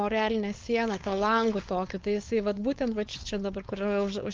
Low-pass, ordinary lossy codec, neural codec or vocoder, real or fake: 7.2 kHz; Opus, 32 kbps; codec, 16 kHz, 4.8 kbps, FACodec; fake